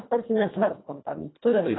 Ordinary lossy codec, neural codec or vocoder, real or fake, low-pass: AAC, 16 kbps; codec, 24 kHz, 1.5 kbps, HILCodec; fake; 7.2 kHz